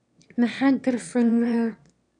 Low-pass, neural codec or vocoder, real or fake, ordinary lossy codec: 9.9 kHz; autoencoder, 22.05 kHz, a latent of 192 numbers a frame, VITS, trained on one speaker; fake; none